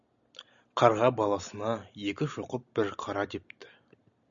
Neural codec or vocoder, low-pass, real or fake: none; 7.2 kHz; real